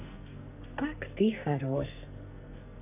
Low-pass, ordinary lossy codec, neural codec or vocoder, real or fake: 3.6 kHz; none; codec, 44.1 kHz, 2.6 kbps, SNAC; fake